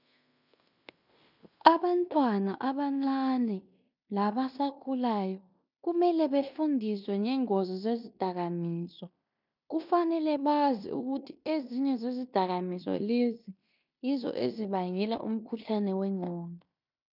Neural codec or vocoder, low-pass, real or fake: codec, 16 kHz in and 24 kHz out, 0.9 kbps, LongCat-Audio-Codec, fine tuned four codebook decoder; 5.4 kHz; fake